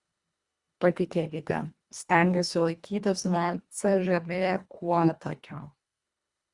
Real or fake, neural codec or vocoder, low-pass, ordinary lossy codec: fake; codec, 24 kHz, 1.5 kbps, HILCodec; 10.8 kHz; Opus, 64 kbps